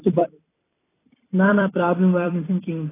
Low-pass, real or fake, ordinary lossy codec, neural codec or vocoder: 3.6 kHz; fake; AAC, 16 kbps; vocoder, 44.1 kHz, 128 mel bands every 256 samples, BigVGAN v2